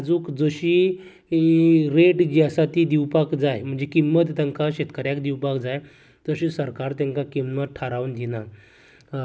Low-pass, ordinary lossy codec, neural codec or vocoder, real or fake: none; none; none; real